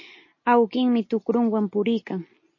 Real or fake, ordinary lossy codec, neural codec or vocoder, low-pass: real; MP3, 32 kbps; none; 7.2 kHz